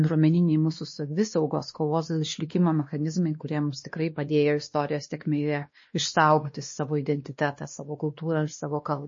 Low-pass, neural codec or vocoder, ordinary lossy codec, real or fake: 7.2 kHz; codec, 16 kHz, 2 kbps, X-Codec, WavLM features, trained on Multilingual LibriSpeech; MP3, 32 kbps; fake